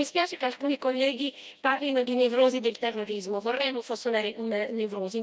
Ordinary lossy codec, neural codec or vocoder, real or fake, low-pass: none; codec, 16 kHz, 1 kbps, FreqCodec, smaller model; fake; none